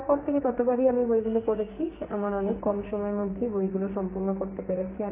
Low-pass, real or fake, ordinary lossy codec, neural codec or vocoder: 3.6 kHz; fake; AAC, 24 kbps; codec, 44.1 kHz, 2.6 kbps, SNAC